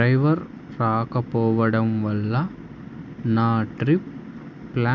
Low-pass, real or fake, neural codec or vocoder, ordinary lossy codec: 7.2 kHz; real; none; none